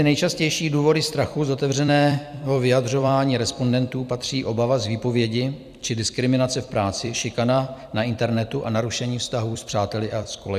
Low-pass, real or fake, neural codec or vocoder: 14.4 kHz; fake; vocoder, 44.1 kHz, 128 mel bands every 256 samples, BigVGAN v2